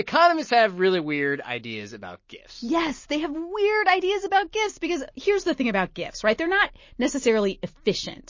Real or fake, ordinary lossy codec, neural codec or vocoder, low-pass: real; MP3, 32 kbps; none; 7.2 kHz